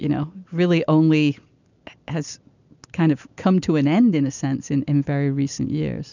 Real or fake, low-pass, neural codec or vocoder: real; 7.2 kHz; none